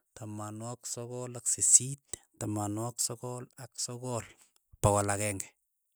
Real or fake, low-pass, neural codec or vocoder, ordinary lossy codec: real; none; none; none